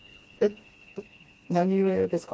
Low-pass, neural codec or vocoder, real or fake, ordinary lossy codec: none; codec, 16 kHz, 2 kbps, FreqCodec, smaller model; fake; none